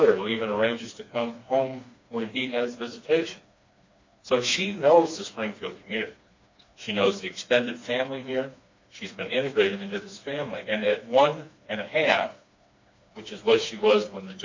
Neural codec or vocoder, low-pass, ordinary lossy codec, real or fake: codec, 16 kHz, 2 kbps, FreqCodec, smaller model; 7.2 kHz; MP3, 48 kbps; fake